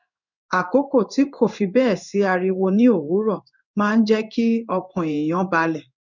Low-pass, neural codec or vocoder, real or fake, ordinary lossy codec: 7.2 kHz; codec, 16 kHz in and 24 kHz out, 1 kbps, XY-Tokenizer; fake; none